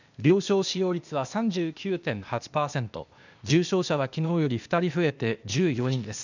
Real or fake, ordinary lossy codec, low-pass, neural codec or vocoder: fake; none; 7.2 kHz; codec, 16 kHz, 0.8 kbps, ZipCodec